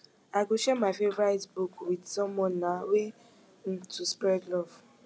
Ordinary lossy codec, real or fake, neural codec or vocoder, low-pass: none; real; none; none